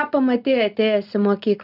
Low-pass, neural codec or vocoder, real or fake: 5.4 kHz; none; real